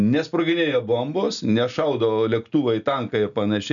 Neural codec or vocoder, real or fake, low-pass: none; real; 7.2 kHz